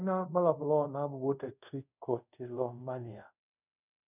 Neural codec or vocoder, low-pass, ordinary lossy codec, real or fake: codec, 24 kHz, 0.5 kbps, DualCodec; 3.6 kHz; none; fake